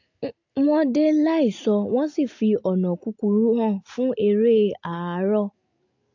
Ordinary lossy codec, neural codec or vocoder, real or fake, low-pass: AAC, 48 kbps; none; real; 7.2 kHz